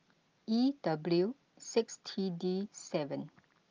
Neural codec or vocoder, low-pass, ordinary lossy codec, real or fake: none; 7.2 kHz; Opus, 24 kbps; real